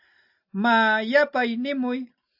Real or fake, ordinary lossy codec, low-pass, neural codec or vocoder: real; AAC, 48 kbps; 5.4 kHz; none